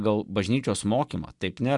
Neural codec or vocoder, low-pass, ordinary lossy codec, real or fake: none; 10.8 kHz; MP3, 96 kbps; real